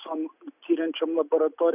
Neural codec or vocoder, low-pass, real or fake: none; 3.6 kHz; real